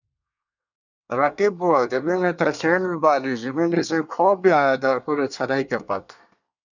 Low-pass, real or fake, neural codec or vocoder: 7.2 kHz; fake; codec, 24 kHz, 1 kbps, SNAC